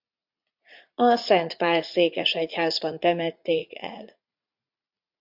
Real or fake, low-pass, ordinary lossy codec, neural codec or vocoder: fake; 5.4 kHz; MP3, 48 kbps; vocoder, 44.1 kHz, 80 mel bands, Vocos